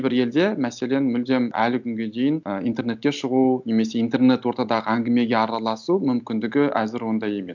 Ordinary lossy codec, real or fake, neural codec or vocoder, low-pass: none; real; none; 7.2 kHz